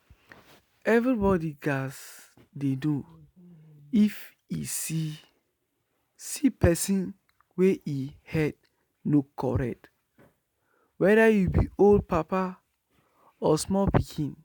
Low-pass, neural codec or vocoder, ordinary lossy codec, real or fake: none; none; none; real